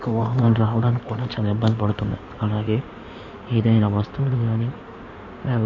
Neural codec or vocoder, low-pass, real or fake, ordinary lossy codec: codec, 16 kHz in and 24 kHz out, 2.2 kbps, FireRedTTS-2 codec; 7.2 kHz; fake; MP3, 48 kbps